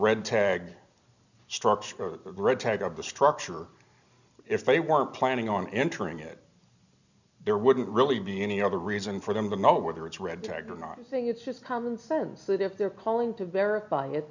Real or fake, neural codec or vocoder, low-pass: real; none; 7.2 kHz